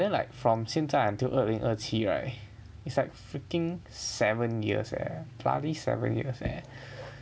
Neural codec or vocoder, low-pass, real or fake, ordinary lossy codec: none; none; real; none